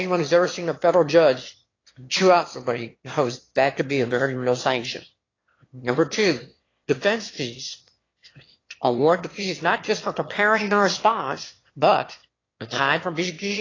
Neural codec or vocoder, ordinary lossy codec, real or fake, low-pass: autoencoder, 22.05 kHz, a latent of 192 numbers a frame, VITS, trained on one speaker; AAC, 32 kbps; fake; 7.2 kHz